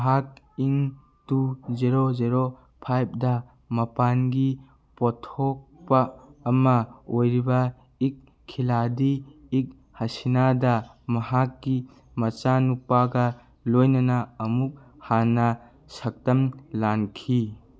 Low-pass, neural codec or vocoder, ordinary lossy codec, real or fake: none; none; none; real